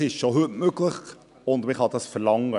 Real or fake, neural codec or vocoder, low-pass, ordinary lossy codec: real; none; 10.8 kHz; none